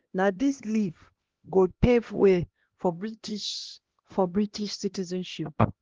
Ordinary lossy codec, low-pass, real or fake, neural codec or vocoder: Opus, 16 kbps; 7.2 kHz; fake; codec, 16 kHz, 1 kbps, X-Codec, HuBERT features, trained on LibriSpeech